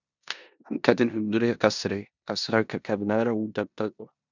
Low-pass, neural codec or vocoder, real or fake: 7.2 kHz; codec, 16 kHz in and 24 kHz out, 0.9 kbps, LongCat-Audio-Codec, four codebook decoder; fake